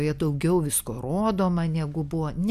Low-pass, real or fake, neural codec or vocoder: 14.4 kHz; real; none